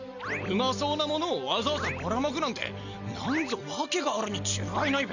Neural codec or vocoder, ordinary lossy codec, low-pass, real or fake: none; none; 7.2 kHz; real